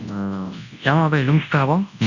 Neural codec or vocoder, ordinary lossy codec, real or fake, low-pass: codec, 24 kHz, 0.9 kbps, WavTokenizer, large speech release; none; fake; 7.2 kHz